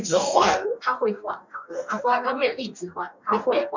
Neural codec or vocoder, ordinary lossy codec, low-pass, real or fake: codec, 44.1 kHz, 2.6 kbps, DAC; none; 7.2 kHz; fake